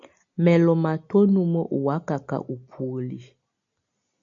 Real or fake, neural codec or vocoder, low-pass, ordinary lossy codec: real; none; 7.2 kHz; AAC, 64 kbps